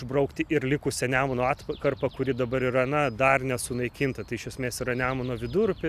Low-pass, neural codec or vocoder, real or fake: 14.4 kHz; none; real